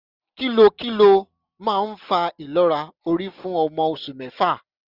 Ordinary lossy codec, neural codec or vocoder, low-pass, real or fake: none; none; 5.4 kHz; real